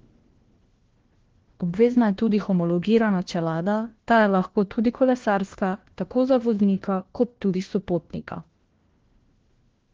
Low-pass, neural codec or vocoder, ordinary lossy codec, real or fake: 7.2 kHz; codec, 16 kHz, 1 kbps, FunCodec, trained on LibriTTS, 50 frames a second; Opus, 16 kbps; fake